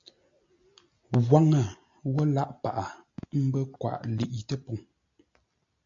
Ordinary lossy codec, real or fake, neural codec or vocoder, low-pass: AAC, 64 kbps; real; none; 7.2 kHz